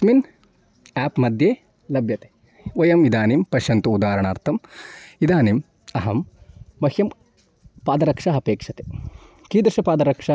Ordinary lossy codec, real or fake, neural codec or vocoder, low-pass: none; real; none; none